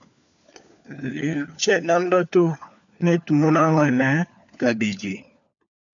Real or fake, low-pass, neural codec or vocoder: fake; 7.2 kHz; codec, 16 kHz, 4 kbps, FunCodec, trained on LibriTTS, 50 frames a second